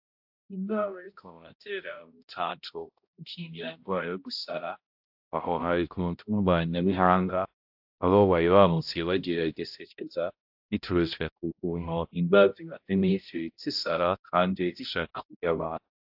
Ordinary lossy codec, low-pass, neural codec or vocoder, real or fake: AAC, 48 kbps; 5.4 kHz; codec, 16 kHz, 0.5 kbps, X-Codec, HuBERT features, trained on balanced general audio; fake